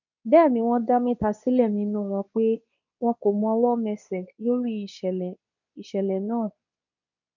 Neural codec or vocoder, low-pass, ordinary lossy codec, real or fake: codec, 24 kHz, 0.9 kbps, WavTokenizer, medium speech release version 2; 7.2 kHz; none; fake